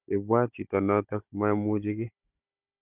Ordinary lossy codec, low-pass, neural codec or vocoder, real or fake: none; 3.6 kHz; codec, 16 kHz, 16 kbps, FunCodec, trained on Chinese and English, 50 frames a second; fake